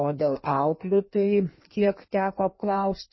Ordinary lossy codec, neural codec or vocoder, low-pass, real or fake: MP3, 24 kbps; codec, 16 kHz in and 24 kHz out, 1.1 kbps, FireRedTTS-2 codec; 7.2 kHz; fake